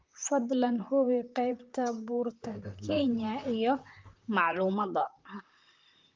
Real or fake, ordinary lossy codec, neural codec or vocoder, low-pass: fake; Opus, 32 kbps; vocoder, 44.1 kHz, 128 mel bands, Pupu-Vocoder; 7.2 kHz